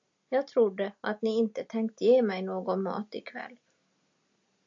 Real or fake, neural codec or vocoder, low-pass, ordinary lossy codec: real; none; 7.2 kHz; AAC, 64 kbps